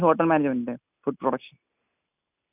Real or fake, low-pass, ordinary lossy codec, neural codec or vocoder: real; 3.6 kHz; none; none